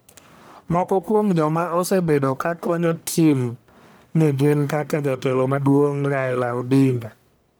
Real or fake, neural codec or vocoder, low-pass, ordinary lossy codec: fake; codec, 44.1 kHz, 1.7 kbps, Pupu-Codec; none; none